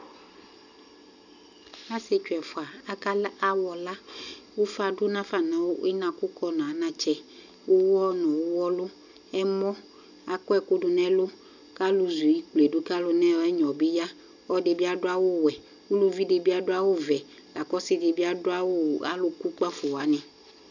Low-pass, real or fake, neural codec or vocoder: 7.2 kHz; real; none